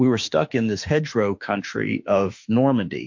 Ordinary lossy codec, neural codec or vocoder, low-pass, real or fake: MP3, 48 kbps; autoencoder, 48 kHz, 32 numbers a frame, DAC-VAE, trained on Japanese speech; 7.2 kHz; fake